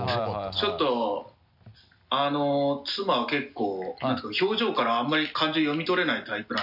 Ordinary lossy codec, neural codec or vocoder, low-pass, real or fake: none; none; 5.4 kHz; real